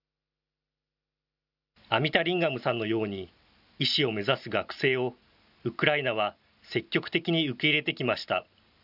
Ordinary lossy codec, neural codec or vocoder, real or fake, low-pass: none; none; real; 5.4 kHz